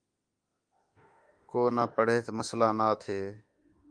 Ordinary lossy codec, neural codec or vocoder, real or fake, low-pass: Opus, 32 kbps; autoencoder, 48 kHz, 32 numbers a frame, DAC-VAE, trained on Japanese speech; fake; 9.9 kHz